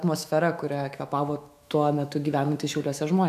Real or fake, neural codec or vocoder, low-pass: fake; autoencoder, 48 kHz, 128 numbers a frame, DAC-VAE, trained on Japanese speech; 14.4 kHz